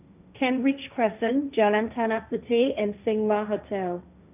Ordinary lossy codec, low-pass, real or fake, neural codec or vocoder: none; 3.6 kHz; fake; codec, 16 kHz, 1.1 kbps, Voila-Tokenizer